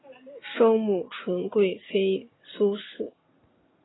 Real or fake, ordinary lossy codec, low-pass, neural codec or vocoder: real; AAC, 16 kbps; 7.2 kHz; none